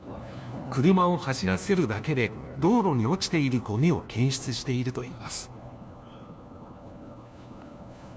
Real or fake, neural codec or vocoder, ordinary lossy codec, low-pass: fake; codec, 16 kHz, 1 kbps, FunCodec, trained on LibriTTS, 50 frames a second; none; none